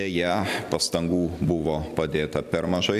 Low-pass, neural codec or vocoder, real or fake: 14.4 kHz; none; real